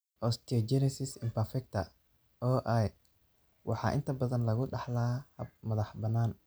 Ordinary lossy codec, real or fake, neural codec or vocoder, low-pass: none; real; none; none